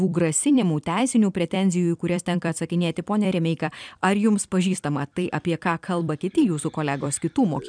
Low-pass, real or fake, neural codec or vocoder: 9.9 kHz; fake; vocoder, 44.1 kHz, 128 mel bands every 256 samples, BigVGAN v2